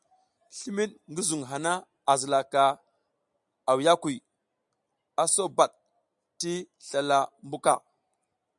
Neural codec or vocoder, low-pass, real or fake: none; 10.8 kHz; real